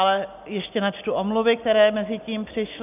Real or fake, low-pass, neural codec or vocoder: real; 3.6 kHz; none